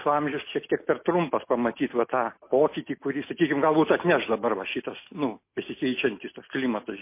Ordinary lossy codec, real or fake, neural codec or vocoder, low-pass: MP3, 24 kbps; real; none; 3.6 kHz